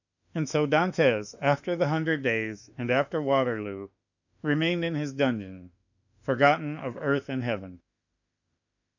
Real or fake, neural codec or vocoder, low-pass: fake; autoencoder, 48 kHz, 32 numbers a frame, DAC-VAE, trained on Japanese speech; 7.2 kHz